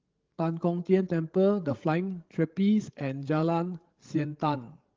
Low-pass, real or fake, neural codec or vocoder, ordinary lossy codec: 7.2 kHz; fake; codec, 16 kHz, 16 kbps, FreqCodec, larger model; Opus, 16 kbps